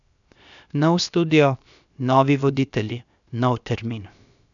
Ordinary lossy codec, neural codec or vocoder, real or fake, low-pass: none; codec, 16 kHz, 0.7 kbps, FocalCodec; fake; 7.2 kHz